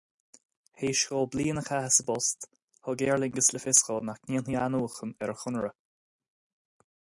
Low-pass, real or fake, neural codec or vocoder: 10.8 kHz; real; none